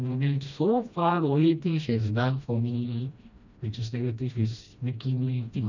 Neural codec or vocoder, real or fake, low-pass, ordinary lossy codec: codec, 16 kHz, 1 kbps, FreqCodec, smaller model; fake; 7.2 kHz; none